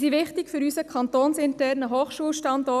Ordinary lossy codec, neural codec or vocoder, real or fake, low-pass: none; none; real; 14.4 kHz